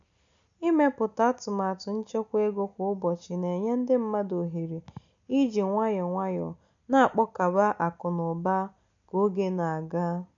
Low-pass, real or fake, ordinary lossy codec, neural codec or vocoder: 7.2 kHz; real; none; none